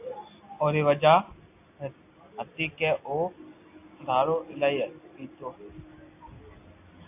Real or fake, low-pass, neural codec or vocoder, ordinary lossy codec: real; 3.6 kHz; none; AAC, 24 kbps